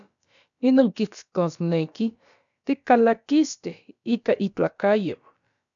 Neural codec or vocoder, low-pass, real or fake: codec, 16 kHz, about 1 kbps, DyCAST, with the encoder's durations; 7.2 kHz; fake